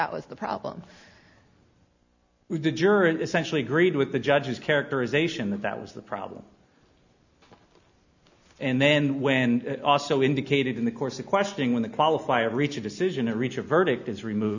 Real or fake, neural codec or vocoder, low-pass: real; none; 7.2 kHz